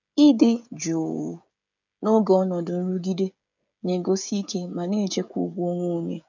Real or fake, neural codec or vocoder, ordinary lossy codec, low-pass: fake; codec, 16 kHz, 16 kbps, FreqCodec, smaller model; none; 7.2 kHz